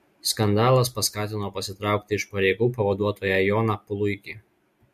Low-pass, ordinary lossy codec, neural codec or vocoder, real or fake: 14.4 kHz; MP3, 64 kbps; none; real